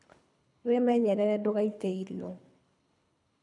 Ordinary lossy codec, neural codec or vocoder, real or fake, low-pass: none; codec, 24 kHz, 3 kbps, HILCodec; fake; 10.8 kHz